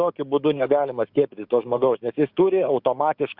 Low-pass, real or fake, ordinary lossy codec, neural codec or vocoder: 5.4 kHz; fake; Opus, 64 kbps; codec, 16 kHz, 6 kbps, DAC